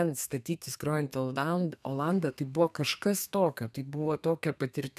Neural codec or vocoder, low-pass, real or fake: codec, 32 kHz, 1.9 kbps, SNAC; 14.4 kHz; fake